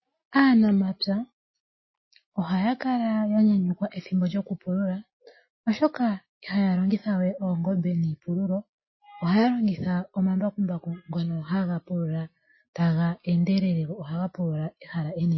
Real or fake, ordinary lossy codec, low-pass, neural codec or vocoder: real; MP3, 24 kbps; 7.2 kHz; none